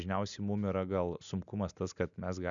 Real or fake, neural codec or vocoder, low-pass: real; none; 7.2 kHz